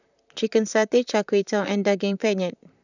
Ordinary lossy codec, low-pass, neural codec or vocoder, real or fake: none; 7.2 kHz; vocoder, 44.1 kHz, 128 mel bands, Pupu-Vocoder; fake